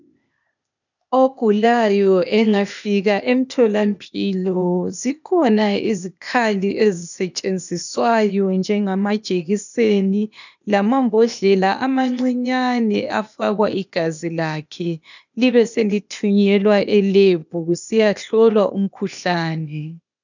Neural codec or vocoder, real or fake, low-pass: codec, 16 kHz, 0.8 kbps, ZipCodec; fake; 7.2 kHz